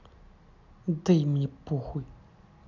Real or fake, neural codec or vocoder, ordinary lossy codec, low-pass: real; none; none; 7.2 kHz